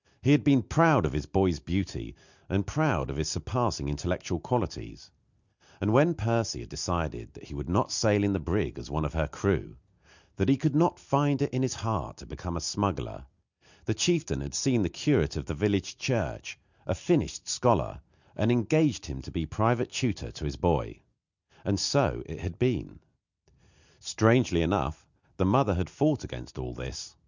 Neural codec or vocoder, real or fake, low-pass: none; real; 7.2 kHz